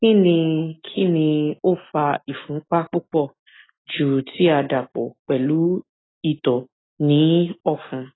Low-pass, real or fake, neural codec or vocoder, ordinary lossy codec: 7.2 kHz; real; none; AAC, 16 kbps